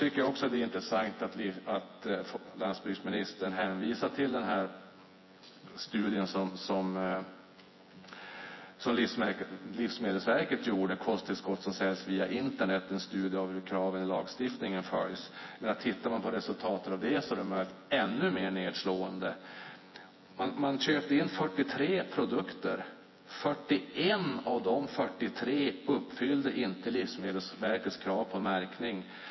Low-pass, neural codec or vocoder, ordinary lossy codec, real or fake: 7.2 kHz; vocoder, 24 kHz, 100 mel bands, Vocos; MP3, 24 kbps; fake